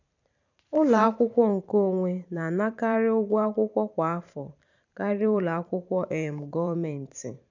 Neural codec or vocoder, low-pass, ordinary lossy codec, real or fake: vocoder, 44.1 kHz, 128 mel bands every 512 samples, BigVGAN v2; 7.2 kHz; none; fake